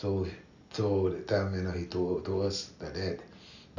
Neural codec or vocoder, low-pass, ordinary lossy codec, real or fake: none; 7.2 kHz; none; real